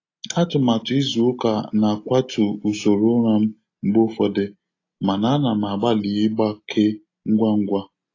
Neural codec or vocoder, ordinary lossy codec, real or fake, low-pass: none; AAC, 32 kbps; real; 7.2 kHz